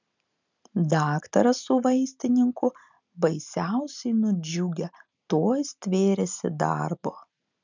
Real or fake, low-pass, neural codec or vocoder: real; 7.2 kHz; none